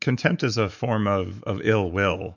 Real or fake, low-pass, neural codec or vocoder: fake; 7.2 kHz; autoencoder, 48 kHz, 128 numbers a frame, DAC-VAE, trained on Japanese speech